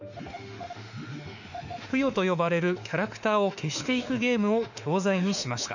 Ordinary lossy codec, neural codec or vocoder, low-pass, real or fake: none; autoencoder, 48 kHz, 32 numbers a frame, DAC-VAE, trained on Japanese speech; 7.2 kHz; fake